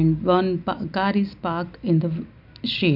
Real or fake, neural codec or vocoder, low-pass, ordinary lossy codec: real; none; 5.4 kHz; MP3, 48 kbps